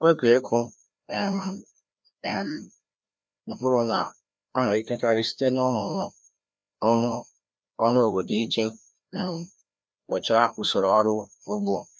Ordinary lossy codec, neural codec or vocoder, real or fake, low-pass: none; codec, 16 kHz, 1 kbps, FreqCodec, larger model; fake; none